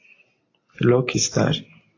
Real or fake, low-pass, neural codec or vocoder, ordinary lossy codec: real; 7.2 kHz; none; AAC, 32 kbps